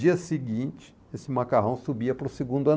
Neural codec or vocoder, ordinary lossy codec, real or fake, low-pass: none; none; real; none